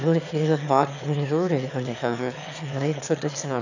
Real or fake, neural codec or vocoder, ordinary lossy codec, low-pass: fake; autoencoder, 22.05 kHz, a latent of 192 numbers a frame, VITS, trained on one speaker; none; 7.2 kHz